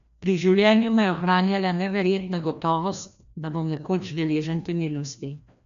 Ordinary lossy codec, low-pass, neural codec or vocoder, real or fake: none; 7.2 kHz; codec, 16 kHz, 1 kbps, FreqCodec, larger model; fake